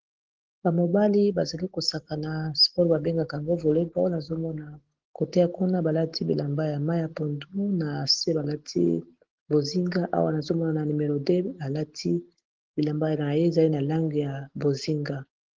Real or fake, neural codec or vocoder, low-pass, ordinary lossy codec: real; none; 7.2 kHz; Opus, 16 kbps